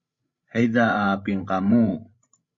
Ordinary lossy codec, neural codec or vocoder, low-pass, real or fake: Opus, 64 kbps; codec, 16 kHz, 16 kbps, FreqCodec, larger model; 7.2 kHz; fake